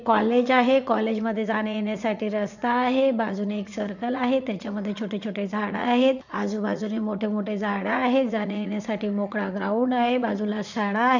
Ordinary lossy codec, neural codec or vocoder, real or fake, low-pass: none; vocoder, 44.1 kHz, 80 mel bands, Vocos; fake; 7.2 kHz